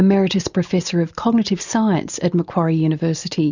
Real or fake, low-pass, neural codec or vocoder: real; 7.2 kHz; none